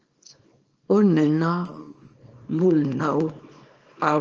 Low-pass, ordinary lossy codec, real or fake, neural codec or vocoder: 7.2 kHz; Opus, 24 kbps; fake; codec, 24 kHz, 0.9 kbps, WavTokenizer, small release